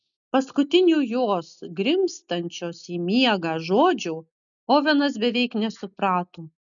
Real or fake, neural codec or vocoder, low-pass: real; none; 7.2 kHz